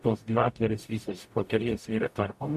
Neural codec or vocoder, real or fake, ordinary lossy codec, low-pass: codec, 44.1 kHz, 0.9 kbps, DAC; fake; MP3, 64 kbps; 14.4 kHz